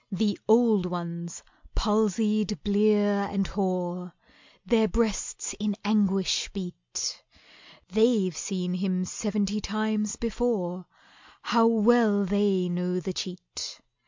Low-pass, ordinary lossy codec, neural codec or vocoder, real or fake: 7.2 kHz; MP3, 64 kbps; none; real